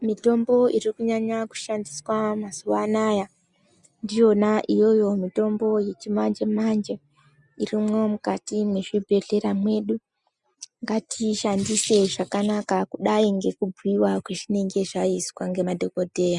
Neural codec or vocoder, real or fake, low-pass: none; real; 10.8 kHz